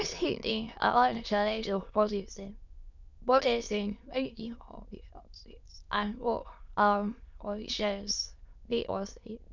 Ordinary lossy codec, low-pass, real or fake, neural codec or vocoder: none; 7.2 kHz; fake; autoencoder, 22.05 kHz, a latent of 192 numbers a frame, VITS, trained on many speakers